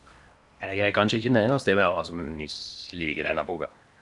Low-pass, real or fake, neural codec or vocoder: 10.8 kHz; fake; codec, 16 kHz in and 24 kHz out, 0.8 kbps, FocalCodec, streaming, 65536 codes